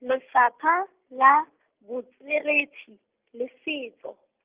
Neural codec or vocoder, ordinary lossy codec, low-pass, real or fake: none; Opus, 24 kbps; 3.6 kHz; real